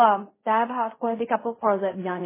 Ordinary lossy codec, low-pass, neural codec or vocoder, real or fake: MP3, 16 kbps; 3.6 kHz; codec, 16 kHz in and 24 kHz out, 0.4 kbps, LongCat-Audio-Codec, fine tuned four codebook decoder; fake